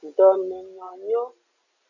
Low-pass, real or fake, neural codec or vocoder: 7.2 kHz; real; none